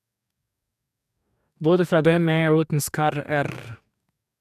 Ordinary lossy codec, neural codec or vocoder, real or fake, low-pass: none; codec, 44.1 kHz, 2.6 kbps, DAC; fake; 14.4 kHz